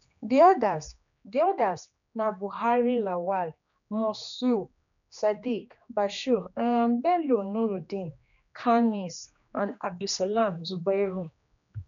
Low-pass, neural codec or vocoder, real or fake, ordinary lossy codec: 7.2 kHz; codec, 16 kHz, 2 kbps, X-Codec, HuBERT features, trained on general audio; fake; none